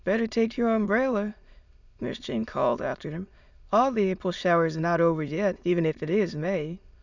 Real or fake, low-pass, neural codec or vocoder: fake; 7.2 kHz; autoencoder, 22.05 kHz, a latent of 192 numbers a frame, VITS, trained on many speakers